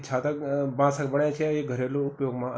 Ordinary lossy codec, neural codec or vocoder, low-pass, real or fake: none; none; none; real